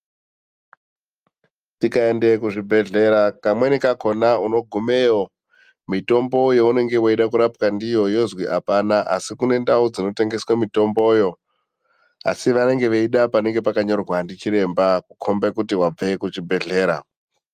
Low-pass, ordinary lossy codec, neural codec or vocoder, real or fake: 14.4 kHz; Opus, 32 kbps; none; real